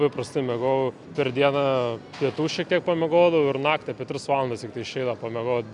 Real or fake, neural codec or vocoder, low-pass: real; none; 10.8 kHz